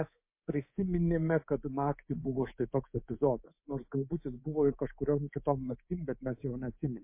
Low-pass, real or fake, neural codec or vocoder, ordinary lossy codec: 3.6 kHz; fake; codec, 24 kHz, 3.1 kbps, DualCodec; MP3, 24 kbps